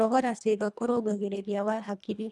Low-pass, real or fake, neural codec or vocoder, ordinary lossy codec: none; fake; codec, 24 kHz, 1.5 kbps, HILCodec; none